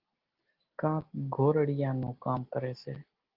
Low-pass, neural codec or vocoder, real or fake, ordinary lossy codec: 5.4 kHz; none; real; Opus, 16 kbps